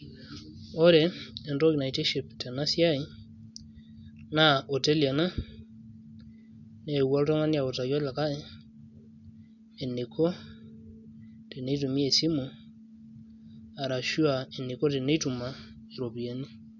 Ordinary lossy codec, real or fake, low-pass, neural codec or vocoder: none; real; 7.2 kHz; none